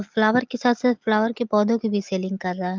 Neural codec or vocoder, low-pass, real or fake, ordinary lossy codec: none; 7.2 kHz; real; Opus, 32 kbps